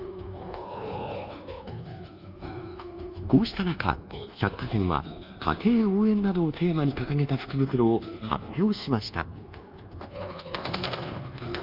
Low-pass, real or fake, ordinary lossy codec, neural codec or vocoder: 5.4 kHz; fake; Opus, 32 kbps; codec, 24 kHz, 1.2 kbps, DualCodec